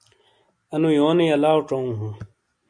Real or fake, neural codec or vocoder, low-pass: real; none; 9.9 kHz